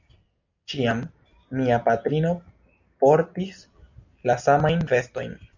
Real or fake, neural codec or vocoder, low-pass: real; none; 7.2 kHz